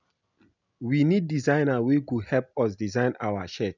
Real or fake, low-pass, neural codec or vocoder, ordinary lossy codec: real; 7.2 kHz; none; none